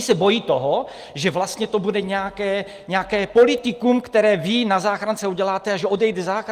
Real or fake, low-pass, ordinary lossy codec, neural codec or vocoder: real; 14.4 kHz; Opus, 32 kbps; none